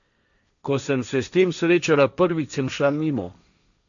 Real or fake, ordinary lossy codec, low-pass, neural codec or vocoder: fake; none; 7.2 kHz; codec, 16 kHz, 1.1 kbps, Voila-Tokenizer